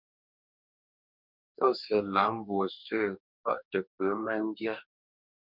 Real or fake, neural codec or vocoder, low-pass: fake; codec, 44.1 kHz, 2.6 kbps, DAC; 5.4 kHz